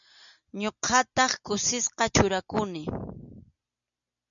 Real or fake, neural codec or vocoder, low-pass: real; none; 7.2 kHz